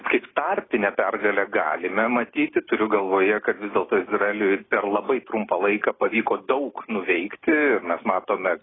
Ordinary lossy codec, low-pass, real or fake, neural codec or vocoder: AAC, 16 kbps; 7.2 kHz; real; none